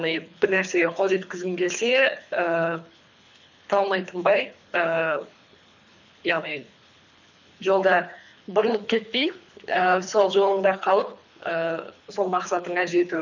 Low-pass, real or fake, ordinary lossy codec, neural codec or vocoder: 7.2 kHz; fake; none; codec, 24 kHz, 3 kbps, HILCodec